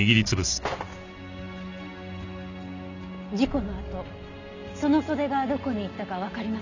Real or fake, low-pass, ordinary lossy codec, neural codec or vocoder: real; 7.2 kHz; none; none